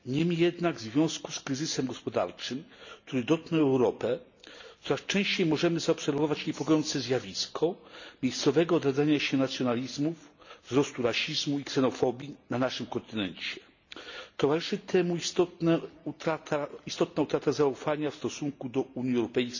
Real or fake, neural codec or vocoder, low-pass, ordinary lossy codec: real; none; 7.2 kHz; none